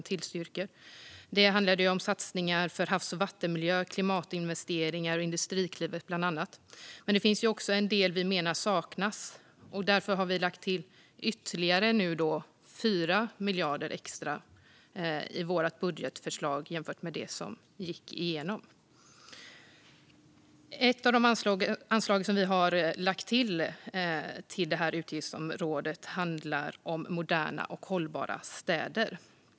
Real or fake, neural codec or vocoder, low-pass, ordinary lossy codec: real; none; none; none